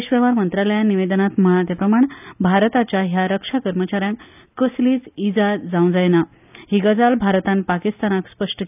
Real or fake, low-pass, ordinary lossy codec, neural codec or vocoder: real; 3.6 kHz; none; none